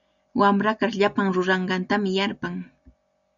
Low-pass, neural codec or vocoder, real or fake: 7.2 kHz; none; real